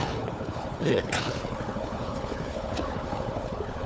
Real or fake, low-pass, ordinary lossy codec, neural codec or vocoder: fake; none; none; codec, 16 kHz, 4 kbps, FunCodec, trained on Chinese and English, 50 frames a second